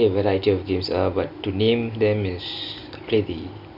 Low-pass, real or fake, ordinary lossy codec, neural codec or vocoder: 5.4 kHz; real; none; none